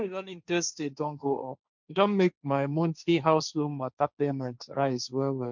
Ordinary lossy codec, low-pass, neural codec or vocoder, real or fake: none; 7.2 kHz; codec, 16 kHz, 1.1 kbps, Voila-Tokenizer; fake